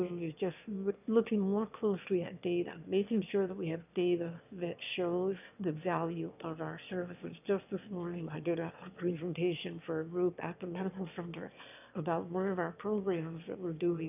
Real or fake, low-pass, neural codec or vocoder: fake; 3.6 kHz; autoencoder, 22.05 kHz, a latent of 192 numbers a frame, VITS, trained on one speaker